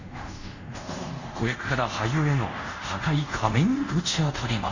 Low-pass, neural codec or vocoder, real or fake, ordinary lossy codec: 7.2 kHz; codec, 24 kHz, 0.5 kbps, DualCodec; fake; Opus, 64 kbps